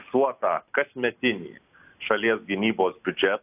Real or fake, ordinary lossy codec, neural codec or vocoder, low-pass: real; AAC, 32 kbps; none; 3.6 kHz